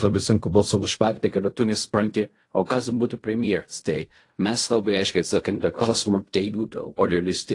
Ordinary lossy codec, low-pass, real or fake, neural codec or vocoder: AAC, 48 kbps; 10.8 kHz; fake; codec, 16 kHz in and 24 kHz out, 0.4 kbps, LongCat-Audio-Codec, fine tuned four codebook decoder